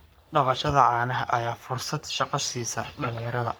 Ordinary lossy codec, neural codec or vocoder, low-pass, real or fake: none; codec, 44.1 kHz, 3.4 kbps, Pupu-Codec; none; fake